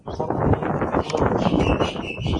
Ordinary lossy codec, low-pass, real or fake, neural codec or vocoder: AAC, 32 kbps; 10.8 kHz; real; none